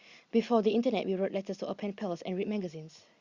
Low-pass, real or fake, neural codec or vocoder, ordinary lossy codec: 7.2 kHz; real; none; Opus, 64 kbps